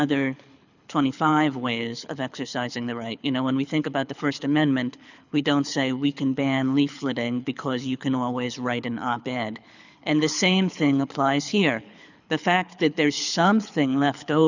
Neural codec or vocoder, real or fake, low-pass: codec, 24 kHz, 6 kbps, HILCodec; fake; 7.2 kHz